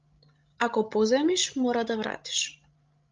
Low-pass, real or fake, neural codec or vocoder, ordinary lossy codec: 7.2 kHz; fake; codec, 16 kHz, 16 kbps, FreqCodec, larger model; Opus, 32 kbps